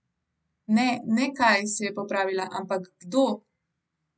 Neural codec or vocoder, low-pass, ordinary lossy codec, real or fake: none; none; none; real